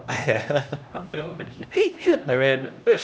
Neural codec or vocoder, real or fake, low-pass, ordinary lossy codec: codec, 16 kHz, 1 kbps, X-Codec, HuBERT features, trained on LibriSpeech; fake; none; none